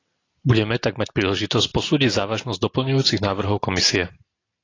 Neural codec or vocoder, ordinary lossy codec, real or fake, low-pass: none; AAC, 32 kbps; real; 7.2 kHz